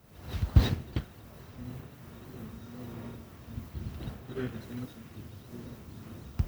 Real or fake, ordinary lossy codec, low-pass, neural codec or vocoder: fake; none; none; codec, 44.1 kHz, 1.7 kbps, Pupu-Codec